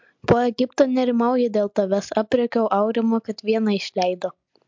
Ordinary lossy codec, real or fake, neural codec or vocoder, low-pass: MP3, 64 kbps; real; none; 7.2 kHz